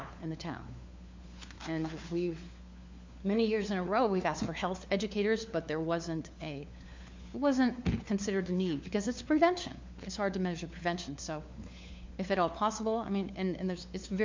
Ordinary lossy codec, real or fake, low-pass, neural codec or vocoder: MP3, 64 kbps; fake; 7.2 kHz; codec, 16 kHz, 4 kbps, FunCodec, trained on LibriTTS, 50 frames a second